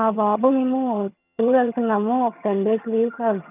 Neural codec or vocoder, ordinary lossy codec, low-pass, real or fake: vocoder, 22.05 kHz, 80 mel bands, HiFi-GAN; none; 3.6 kHz; fake